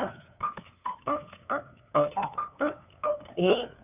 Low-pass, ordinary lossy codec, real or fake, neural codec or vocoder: 3.6 kHz; none; fake; codec, 16 kHz, 2 kbps, FunCodec, trained on LibriTTS, 25 frames a second